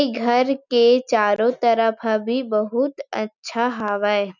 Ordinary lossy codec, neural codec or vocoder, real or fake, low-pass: none; none; real; 7.2 kHz